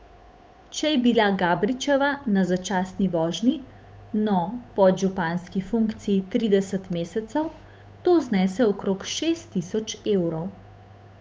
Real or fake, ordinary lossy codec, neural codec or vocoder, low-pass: fake; none; codec, 16 kHz, 8 kbps, FunCodec, trained on Chinese and English, 25 frames a second; none